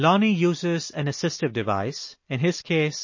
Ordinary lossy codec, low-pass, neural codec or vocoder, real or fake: MP3, 32 kbps; 7.2 kHz; none; real